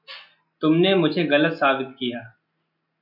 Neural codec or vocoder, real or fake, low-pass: none; real; 5.4 kHz